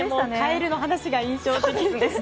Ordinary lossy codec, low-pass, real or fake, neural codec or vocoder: none; none; real; none